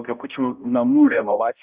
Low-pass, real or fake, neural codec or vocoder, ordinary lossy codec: 3.6 kHz; fake; codec, 16 kHz, 0.5 kbps, X-Codec, HuBERT features, trained on balanced general audio; Opus, 64 kbps